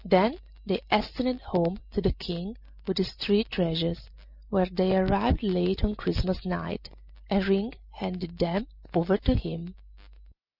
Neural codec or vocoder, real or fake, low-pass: none; real; 5.4 kHz